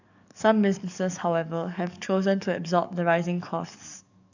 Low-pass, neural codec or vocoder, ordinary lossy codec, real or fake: 7.2 kHz; codec, 44.1 kHz, 7.8 kbps, DAC; none; fake